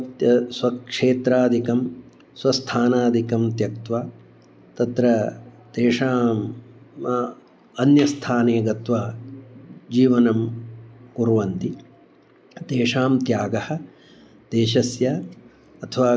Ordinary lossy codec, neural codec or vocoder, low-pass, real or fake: none; none; none; real